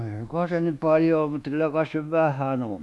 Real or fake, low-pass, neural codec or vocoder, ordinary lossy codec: fake; none; codec, 24 kHz, 1.2 kbps, DualCodec; none